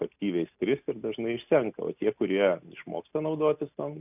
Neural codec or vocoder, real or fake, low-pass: none; real; 3.6 kHz